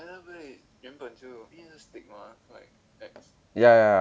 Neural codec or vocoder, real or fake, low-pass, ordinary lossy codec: none; real; none; none